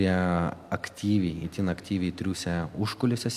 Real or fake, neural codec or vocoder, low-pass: real; none; 14.4 kHz